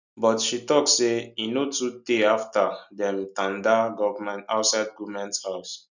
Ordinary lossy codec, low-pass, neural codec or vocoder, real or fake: none; 7.2 kHz; none; real